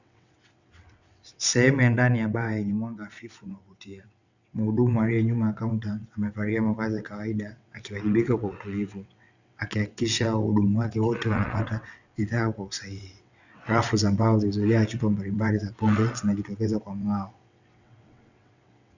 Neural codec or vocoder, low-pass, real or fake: vocoder, 22.05 kHz, 80 mel bands, WaveNeXt; 7.2 kHz; fake